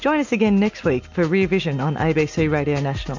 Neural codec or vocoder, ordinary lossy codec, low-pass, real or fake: none; MP3, 64 kbps; 7.2 kHz; real